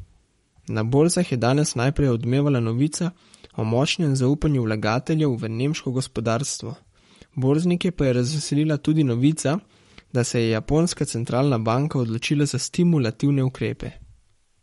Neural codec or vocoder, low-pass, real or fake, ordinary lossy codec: codec, 44.1 kHz, 7.8 kbps, Pupu-Codec; 19.8 kHz; fake; MP3, 48 kbps